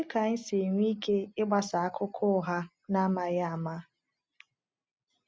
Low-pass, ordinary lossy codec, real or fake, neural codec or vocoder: none; none; real; none